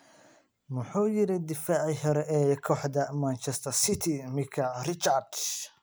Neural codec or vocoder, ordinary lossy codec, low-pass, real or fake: none; none; none; real